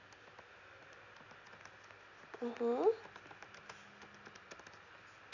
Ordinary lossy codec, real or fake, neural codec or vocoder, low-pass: none; real; none; 7.2 kHz